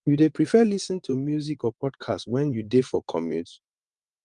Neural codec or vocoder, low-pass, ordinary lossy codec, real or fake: vocoder, 22.05 kHz, 80 mel bands, WaveNeXt; 9.9 kHz; Opus, 32 kbps; fake